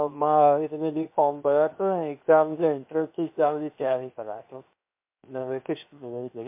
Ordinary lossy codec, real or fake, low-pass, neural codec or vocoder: MP3, 32 kbps; fake; 3.6 kHz; codec, 16 kHz, 0.7 kbps, FocalCodec